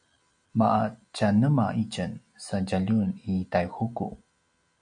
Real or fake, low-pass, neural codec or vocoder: real; 9.9 kHz; none